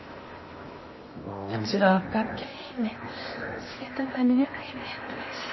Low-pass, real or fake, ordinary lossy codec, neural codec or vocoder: 7.2 kHz; fake; MP3, 24 kbps; codec, 16 kHz in and 24 kHz out, 0.8 kbps, FocalCodec, streaming, 65536 codes